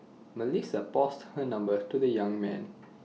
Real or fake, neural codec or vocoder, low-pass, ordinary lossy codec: real; none; none; none